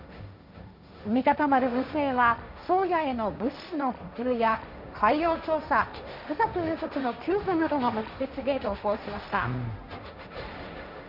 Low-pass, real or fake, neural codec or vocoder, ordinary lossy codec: 5.4 kHz; fake; codec, 16 kHz, 1.1 kbps, Voila-Tokenizer; none